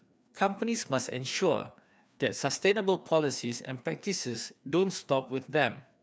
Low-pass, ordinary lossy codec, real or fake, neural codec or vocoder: none; none; fake; codec, 16 kHz, 2 kbps, FreqCodec, larger model